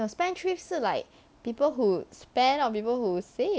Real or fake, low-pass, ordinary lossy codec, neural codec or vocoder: real; none; none; none